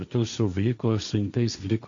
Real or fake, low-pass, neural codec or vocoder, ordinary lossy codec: fake; 7.2 kHz; codec, 16 kHz, 1.1 kbps, Voila-Tokenizer; MP3, 64 kbps